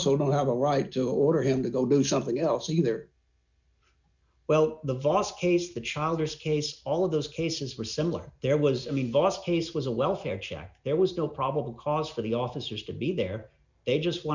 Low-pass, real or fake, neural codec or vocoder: 7.2 kHz; real; none